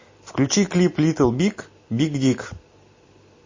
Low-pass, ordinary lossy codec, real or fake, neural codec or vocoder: 7.2 kHz; MP3, 32 kbps; real; none